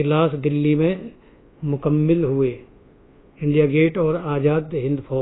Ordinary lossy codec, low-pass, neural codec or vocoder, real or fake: AAC, 16 kbps; 7.2 kHz; codec, 24 kHz, 1.2 kbps, DualCodec; fake